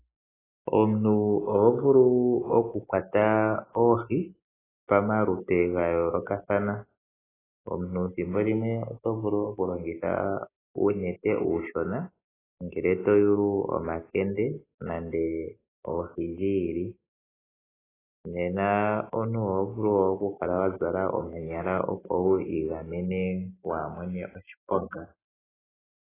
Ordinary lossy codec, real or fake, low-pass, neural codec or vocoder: AAC, 16 kbps; real; 3.6 kHz; none